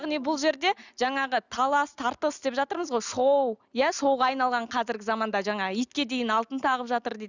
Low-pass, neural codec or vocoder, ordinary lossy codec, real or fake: 7.2 kHz; none; none; real